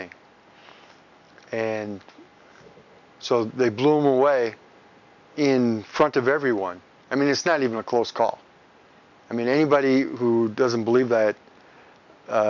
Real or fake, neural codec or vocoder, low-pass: real; none; 7.2 kHz